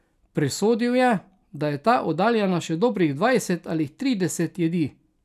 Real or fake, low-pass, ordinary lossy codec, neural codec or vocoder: real; 14.4 kHz; none; none